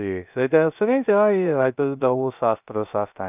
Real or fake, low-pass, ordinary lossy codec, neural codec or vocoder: fake; 3.6 kHz; none; codec, 16 kHz, 0.3 kbps, FocalCodec